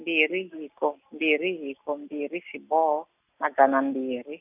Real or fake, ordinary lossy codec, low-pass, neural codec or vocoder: real; none; 3.6 kHz; none